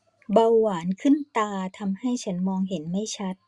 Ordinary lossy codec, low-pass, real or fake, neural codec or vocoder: Opus, 64 kbps; 10.8 kHz; real; none